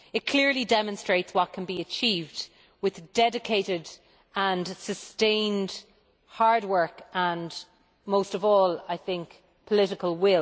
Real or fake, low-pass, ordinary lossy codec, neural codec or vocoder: real; none; none; none